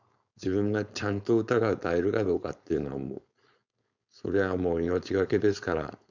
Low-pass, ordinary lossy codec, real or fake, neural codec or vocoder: 7.2 kHz; none; fake; codec, 16 kHz, 4.8 kbps, FACodec